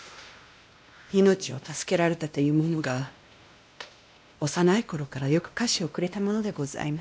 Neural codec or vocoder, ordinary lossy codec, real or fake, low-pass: codec, 16 kHz, 1 kbps, X-Codec, WavLM features, trained on Multilingual LibriSpeech; none; fake; none